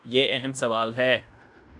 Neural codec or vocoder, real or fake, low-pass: codec, 16 kHz in and 24 kHz out, 0.9 kbps, LongCat-Audio-Codec, fine tuned four codebook decoder; fake; 10.8 kHz